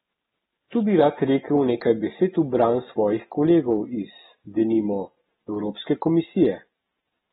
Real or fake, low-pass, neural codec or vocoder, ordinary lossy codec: real; 7.2 kHz; none; AAC, 16 kbps